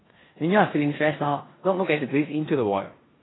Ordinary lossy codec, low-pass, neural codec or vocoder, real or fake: AAC, 16 kbps; 7.2 kHz; codec, 16 kHz in and 24 kHz out, 0.9 kbps, LongCat-Audio-Codec, four codebook decoder; fake